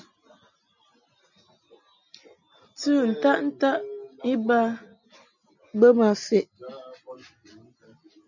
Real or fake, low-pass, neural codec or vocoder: real; 7.2 kHz; none